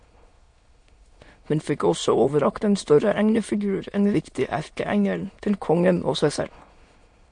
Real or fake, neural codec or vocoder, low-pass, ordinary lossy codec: fake; autoencoder, 22.05 kHz, a latent of 192 numbers a frame, VITS, trained on many speakers; 9.9 kHz; MP3, 48 kbps